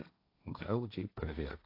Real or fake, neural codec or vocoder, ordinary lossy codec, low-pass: fake; codec, 16 kHz, 1.1 kbps, Voila-Tokenizer; AAC, 32 kbps; 5.4 kHz